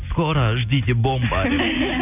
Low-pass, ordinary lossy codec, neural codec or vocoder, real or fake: 3.6 kHz; none; none; real